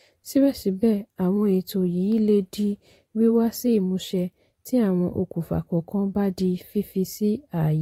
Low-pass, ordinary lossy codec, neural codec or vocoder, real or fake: 19.8 kHz; AAC, 48 kbps; vocoder, 44.1 kHz, 128 mel bands every 512 samples, BigVGAN v2; fake